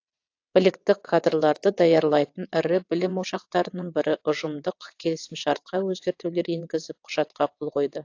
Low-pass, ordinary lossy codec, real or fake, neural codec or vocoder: 7.2 kHz; AAC, 48 kbps; fake; vocoder, 22.05 kHz, 80 mel bands, WaveNeXt